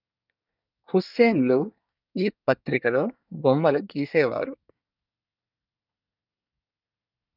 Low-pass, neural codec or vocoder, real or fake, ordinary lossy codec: 5.4 kHz; codec, 24 kHz, 1 kbps, SNAC; fake; none